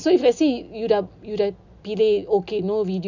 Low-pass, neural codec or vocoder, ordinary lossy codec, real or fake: 7.2 kHz; none; none; real